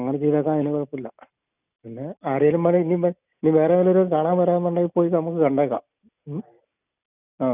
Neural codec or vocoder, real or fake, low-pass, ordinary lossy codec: none; real; 3.6 kHz; none